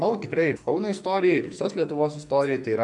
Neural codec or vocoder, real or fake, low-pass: codec, 44.1 kHz, 2.6 kbps, SNAC; fake; 10.8 kHz